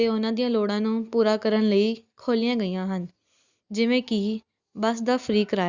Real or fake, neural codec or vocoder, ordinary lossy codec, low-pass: real; none; Opus, 64 kbps; 7.2 kHz